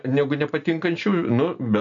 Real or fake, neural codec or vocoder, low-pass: real; none; 7.2 kHz